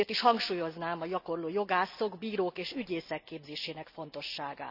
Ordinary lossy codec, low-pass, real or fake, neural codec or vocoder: none; 5.4 kHz; real; none